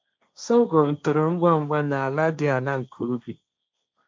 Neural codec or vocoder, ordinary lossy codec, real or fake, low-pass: codec, 16 kHz, 1.1 kbps, Voila-Tokenizer; none; fake; none